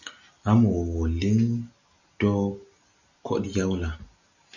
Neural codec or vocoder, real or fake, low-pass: none; real; 7.2 kHz